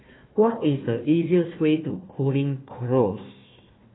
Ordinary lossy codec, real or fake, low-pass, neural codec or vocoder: AAC, 16 kbps; fake; 7.2 kHz; codec, 16 kHz, 1 kbps, FunCodec, trained on Chinese and English, 50 frames a second